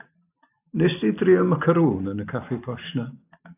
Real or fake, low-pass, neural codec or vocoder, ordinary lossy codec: real; 3.6 kHz; none; AAC, 32 kbps